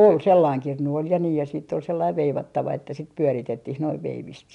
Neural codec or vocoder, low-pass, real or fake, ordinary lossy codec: none; 10.8 kHz; real; none